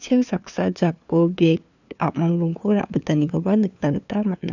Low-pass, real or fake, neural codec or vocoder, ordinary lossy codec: 7.2 kHz; fake; codec, 16 kHz, 2 kbps, FunCodec, trained on LibriTTS, 25 frames a second; none